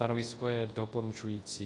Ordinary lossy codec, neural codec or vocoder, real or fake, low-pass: AAC, 32 kbps; codec, 24 kHz, 0.9 kbps, WavTokenizer, large speech release; fake; 10.8 kHz